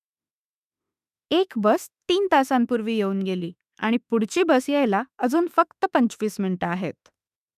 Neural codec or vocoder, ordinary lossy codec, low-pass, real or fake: autoencoder, 48 kHz, 32 numbers a frame, DAC-VAE, trained on Japanese speech; none; 14.4 kHz; fake